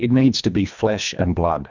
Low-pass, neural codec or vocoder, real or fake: 7.2 kHz; codec, 24 kHz, 1.5 kbps, HILCodec; fake